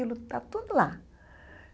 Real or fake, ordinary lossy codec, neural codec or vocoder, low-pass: real; none; none; none